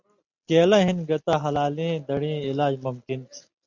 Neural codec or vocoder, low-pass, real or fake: none; 7.2 kHz; real